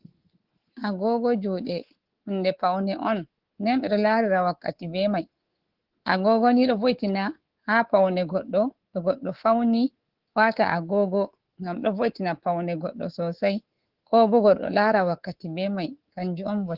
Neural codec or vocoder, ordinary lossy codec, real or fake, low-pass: codec, 24 kHz, 3.1 kbps, DualCodec; Opus, 16 kbps; fake; 5.4 kHz